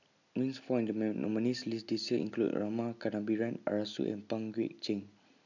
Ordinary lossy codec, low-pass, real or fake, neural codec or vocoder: none; 7.2 kHz; real; none